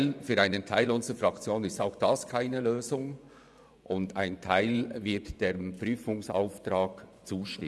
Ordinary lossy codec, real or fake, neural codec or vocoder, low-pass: none; fake; vocoder, 24 kHz, 100 mel bands, Vocos; none